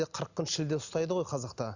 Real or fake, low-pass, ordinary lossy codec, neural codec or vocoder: real; 7.2 kHz; none; none